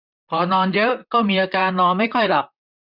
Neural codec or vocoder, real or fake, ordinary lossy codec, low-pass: vocoder, 44.1 kHz, 128 mel bands, Pupu-Vocoder; fake; none; 5.4 kHz